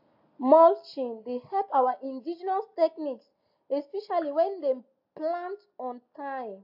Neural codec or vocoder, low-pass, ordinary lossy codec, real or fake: none; 5.4 kHz; AAC, 48 kbps; real